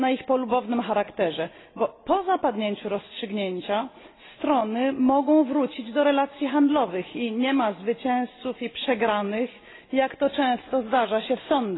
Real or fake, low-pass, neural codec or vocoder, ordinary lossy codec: real; 7.2 kHz; none; AAC, 16 kbps